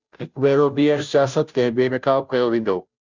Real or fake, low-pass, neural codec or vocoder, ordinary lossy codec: fake; 7.2 kHz; codec, 16 kHz, 0.5 kbps, FunCodec, trained on Chinese and English, 25 frames a second; Opus, 64 kbps